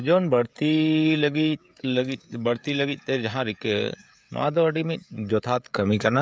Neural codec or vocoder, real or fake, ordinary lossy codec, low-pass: codec, 16 kHz, 16 kbps, FreqCodec, smaller model; fake; none; none